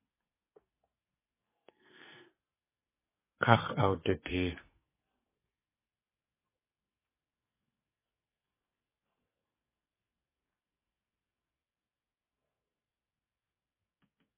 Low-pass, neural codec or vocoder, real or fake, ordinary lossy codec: 3.6 kHz; codec, 44.1 kHz, 2.6 kbps, SNAC; fake; MP3, 16 kbps